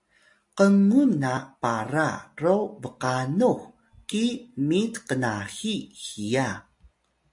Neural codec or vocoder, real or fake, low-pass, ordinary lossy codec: none; real; 10.8 kHz; AAC, 64 kbps